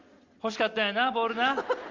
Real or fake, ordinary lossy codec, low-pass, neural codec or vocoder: real; Opus, 32 kbps; 7.2 kHz; none